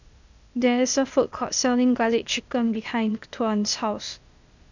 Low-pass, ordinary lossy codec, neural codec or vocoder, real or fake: 7.2 kHz; none; codec, 16 kHz, 0.8 kbps, ZipCodec; fake